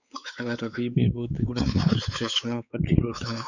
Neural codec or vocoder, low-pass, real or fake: codec, 16 kHz, 4 kbps, X-Codec, WavLM features, trained on Multilingual LibriSpeech; 7.2 kHz; fake